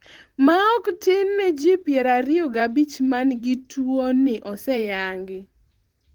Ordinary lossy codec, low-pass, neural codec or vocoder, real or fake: Opus, 24 kbps; 19.8 kHz; vocoder, 44.1 kHz, 128 mel bands, Pupu-Vocoder; fake